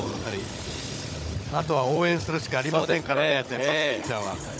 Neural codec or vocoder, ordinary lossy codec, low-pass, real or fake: codec, 16 kHz, 16 kbps, FunCodec, trained on Chinese and English, 50 frames a second; none; none; fake